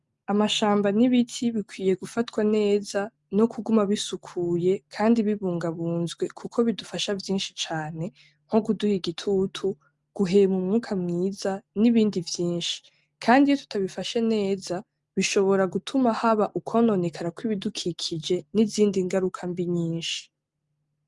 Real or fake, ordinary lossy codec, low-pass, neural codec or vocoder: real; Opus, 32 kbps; 10.8 kHz; none